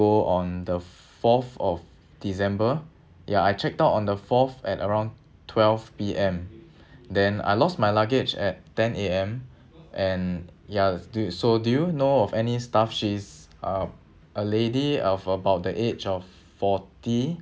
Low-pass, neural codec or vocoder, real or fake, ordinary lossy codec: none; none; real; none